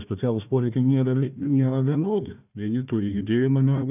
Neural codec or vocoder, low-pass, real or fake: codec, 16 kHz, 1 kbps, FunCodec, trained on Chinese and English, 50 frames a second; 3.6 kHz; fake